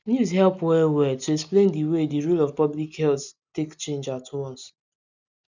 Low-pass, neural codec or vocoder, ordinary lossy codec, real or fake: 7.2 kHz; none; none; real